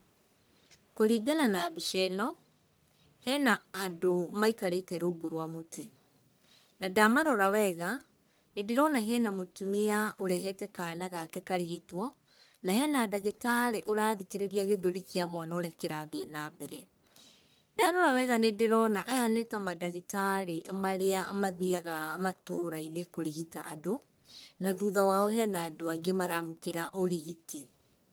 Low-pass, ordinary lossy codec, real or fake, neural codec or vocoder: none; none; fake; codec, 44.1 kHz, 1.7 kbps, Pupu-Codec